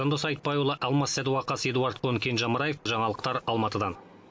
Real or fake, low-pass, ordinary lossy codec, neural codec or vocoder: real; none; none; none